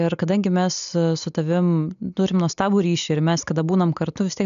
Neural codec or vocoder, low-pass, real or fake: none; 7.2 kHz; real